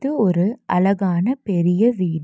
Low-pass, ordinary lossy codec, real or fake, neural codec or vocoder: none; none; real; none